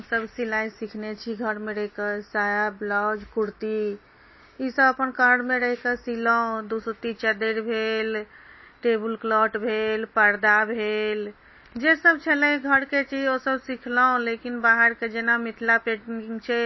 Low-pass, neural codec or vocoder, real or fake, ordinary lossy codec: 7.2 kHz; none; real; MP3, 24 kbps